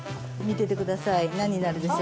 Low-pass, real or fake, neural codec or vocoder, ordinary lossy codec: none; real; none; none